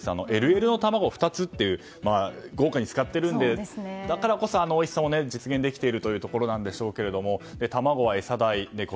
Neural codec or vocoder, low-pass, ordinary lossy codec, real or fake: none; none; none; real